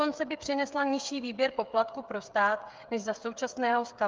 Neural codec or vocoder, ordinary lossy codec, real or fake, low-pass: codec, 16 kHz, 8 kbps, FreqCodec, smaller model; Opus, 32 kbps; fake; 7.2 kHz